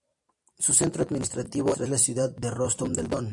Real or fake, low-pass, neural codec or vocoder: fake; 10.8 kHz; vocoder, 44.1 kHz, 128 mel bands every 256 samples, BigVGAN v2